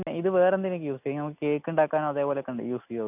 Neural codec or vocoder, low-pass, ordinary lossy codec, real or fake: none; 3.6 kHz; none; real